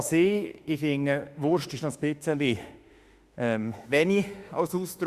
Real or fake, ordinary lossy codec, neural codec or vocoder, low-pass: fake; Opus, 64 kbps; autoencoder, 48 kHz, 32 numbers a frame, DAC-VAE, trained on Japanese speech; 14.4 kHz